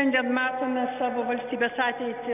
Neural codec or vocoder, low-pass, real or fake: none; 3.6 kHz; real